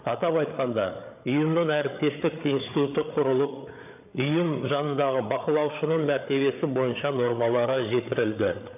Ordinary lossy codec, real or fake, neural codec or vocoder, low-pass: none; fake; codec, 16 kHz, 8 kbps, FreqCodec, larger model; 3.6 kHz